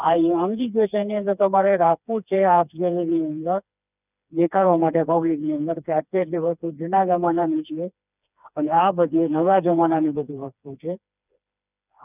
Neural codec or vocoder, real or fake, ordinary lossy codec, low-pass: codec, 16 kHz, 2 kbps, FreqCodec, smaller model; fake; none; 3.6 kHz